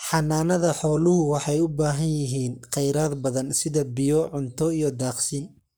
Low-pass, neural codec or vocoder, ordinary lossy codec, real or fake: none; codec, 44.1 kHz, 7.8 kbps, Pupu-Codec; none; fake